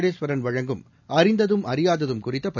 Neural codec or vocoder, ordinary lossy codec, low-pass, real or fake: none; none; 7.2 kHz; real